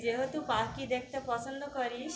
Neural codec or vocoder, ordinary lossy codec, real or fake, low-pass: none; none; real; none